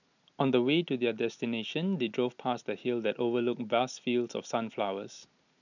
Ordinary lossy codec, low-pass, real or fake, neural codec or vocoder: none; 7.2 kHz; real; none